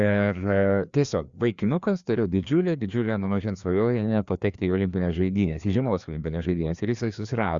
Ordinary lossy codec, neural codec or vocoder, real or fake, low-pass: Opus, 64 kbps; codec, 16 kHz, 2 kbps, FreqCodec, larger model; fake; 7.2 kHz